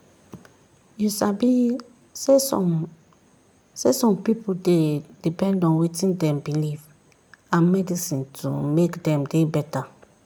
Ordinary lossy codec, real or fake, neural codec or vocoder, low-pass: none; real; none; 19.8 kHz